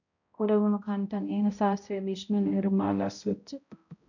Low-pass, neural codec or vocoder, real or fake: 7.2 kHz; codec, 16 kHz, 0.5 kbps, X-Codec, HuBERT features, trained on balanced general audio; fake